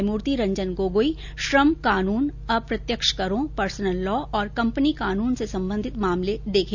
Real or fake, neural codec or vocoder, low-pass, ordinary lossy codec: real; none; 7.2 kHz; none